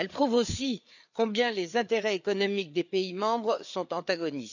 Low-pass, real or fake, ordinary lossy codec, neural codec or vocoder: 7.2 kHz; fake; none; codec, 16 kHz, 4 kbps, FreqCodec, larger model